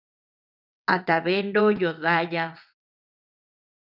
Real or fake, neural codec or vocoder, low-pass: fake; vocoder, 22.05 kHz, 80 mel bands, WaveNeXt; 5.4 kHz